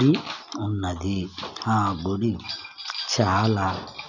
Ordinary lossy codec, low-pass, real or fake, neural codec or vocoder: none; 7.2 kHz; real; none